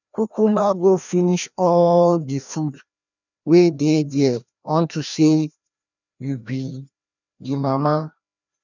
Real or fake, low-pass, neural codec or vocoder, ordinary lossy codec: fake; 7.2 kHz; codec, 16 kHz, 1 kbps, FreqCodec, larger model; none